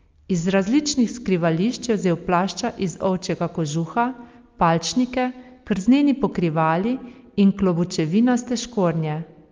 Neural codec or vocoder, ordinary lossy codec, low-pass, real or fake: none; Opus, 32 kbps; 7.2 kHz; real